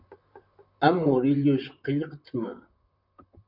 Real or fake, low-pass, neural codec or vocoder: fake; 5.4 kHz; vocoder, 44.1 kHz, 128 mel bands, Pupu-Vocoder